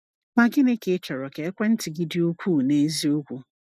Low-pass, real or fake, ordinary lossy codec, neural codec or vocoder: 14.4 kHz; real; none; none